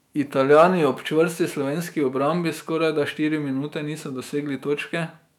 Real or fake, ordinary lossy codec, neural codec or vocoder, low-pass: fake; none; autoencoder, 48 kHz, 128 numbers a frame, DAC-VAE, trained on Japanese speech; 19.8 kHz